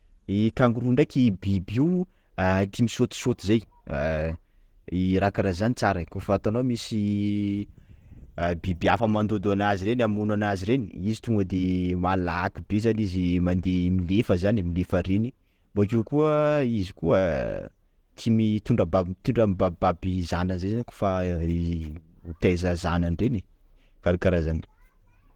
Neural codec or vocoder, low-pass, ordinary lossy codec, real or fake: none; 19.8 kHz; Opus, 16 kbps; real